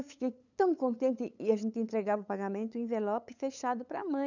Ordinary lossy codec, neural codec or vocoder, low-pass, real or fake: none; codec, 16 kHz, 8 kbps, FunCodec, trained on LibriTTS, 25 frames a second; 7.2 kHz; fake